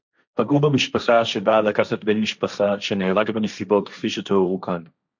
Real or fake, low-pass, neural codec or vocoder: fake; 7.2 kHz; codec, 16 kHz, 1.1 kbps, Voila-Tokenizer